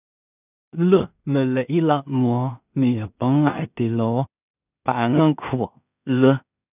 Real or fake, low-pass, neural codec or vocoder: fake; 3.6 kHz; codec, 16 kHz in and 24 kHz out, 0.4 kbps, LongCat-Audio-Codec, two codebook decoder